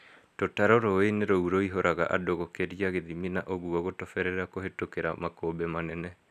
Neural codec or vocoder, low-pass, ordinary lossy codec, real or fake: none; 14.4 kHz; none; real